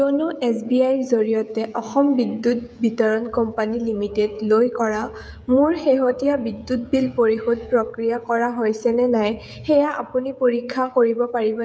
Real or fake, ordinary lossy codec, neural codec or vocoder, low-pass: fake; none; codec, 16 kHz, 16 kbps, FreqCodec, smaller model; none